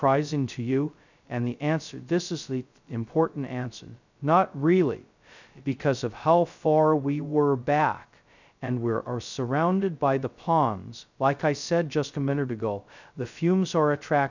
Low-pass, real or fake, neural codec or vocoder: 7.2 kHz; fake; codec, 16 kHz, 0.2 kbps, FocalCodec